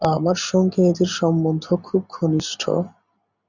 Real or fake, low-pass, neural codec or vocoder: real; 7.2 kHz; none